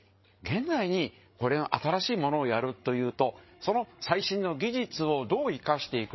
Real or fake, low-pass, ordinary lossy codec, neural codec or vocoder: fake; 7.2 kHz; MP3, 24 kbps; codec, 16 kHz, 8 kbps, FreqCodec, larger model